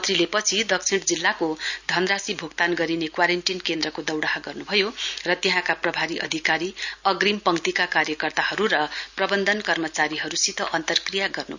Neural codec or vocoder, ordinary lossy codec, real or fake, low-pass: none; none; real; 7.2 kHz